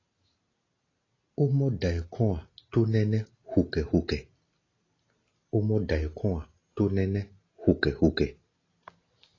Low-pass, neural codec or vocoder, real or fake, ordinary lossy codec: 7.2 kHz; none; real; AAC, 32 kbps